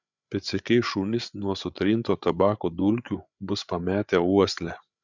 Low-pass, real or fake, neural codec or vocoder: 7.2 kHz; fake; codec, 16 kHz, 8 kbps, FreqCodec, larger model